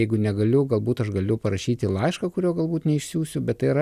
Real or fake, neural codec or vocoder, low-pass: real; none; 14.4 kHz